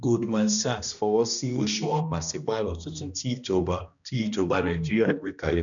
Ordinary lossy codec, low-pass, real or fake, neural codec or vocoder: none; 7.2 kHz; fake; codec, 16 kHz, 1 kbps, X-Codec, HuBERT features, trained on balanced general audio